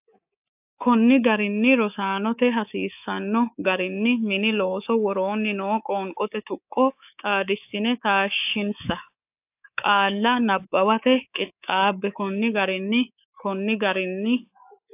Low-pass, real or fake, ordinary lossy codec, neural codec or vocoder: 3.6 kHz; fake; AAC, 32 kbps; codec, 24 kHz, 3.1 kbps, DualCodec